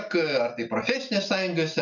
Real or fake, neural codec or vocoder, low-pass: real; none; 7.2 kHz